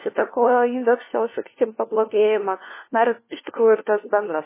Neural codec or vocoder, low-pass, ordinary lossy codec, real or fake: codec, 16 kHz, 1 kbps, FunCodec, trained on LibriTTS, 50 frames a second; 3.6 kHz; MP3, 16 kbps; fake